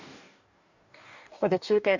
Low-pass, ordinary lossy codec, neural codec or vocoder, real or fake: 7.2 kHz; none; codec, 44.1 kHz, 2.6 kbps, DAC; fake